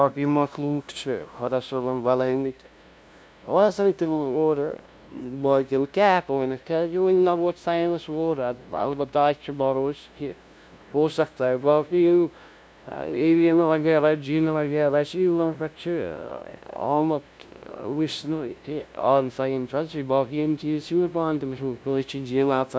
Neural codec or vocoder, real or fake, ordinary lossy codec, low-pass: codec, 16 kHz, 0.5 kbps, FunCodec, trained on LibriTTS, 25 frames a second; fake; none; none